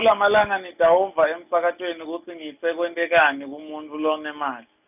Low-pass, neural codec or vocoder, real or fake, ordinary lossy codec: 3.6 kHz; none; real; none